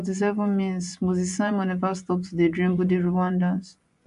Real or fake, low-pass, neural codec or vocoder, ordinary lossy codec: fake; 10.8 kHz; vocoder, 24 kHz, 100 mel bands, Vocos; AAC, 96 kbps